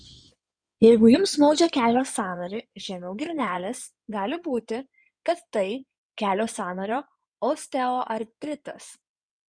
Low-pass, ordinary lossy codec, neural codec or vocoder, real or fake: 9.9 kHz; Opus, 64 kbps; codec, 16 kHz in and 24 kHz out, 2.2 kbps, FireRedTTS-2 codec; fake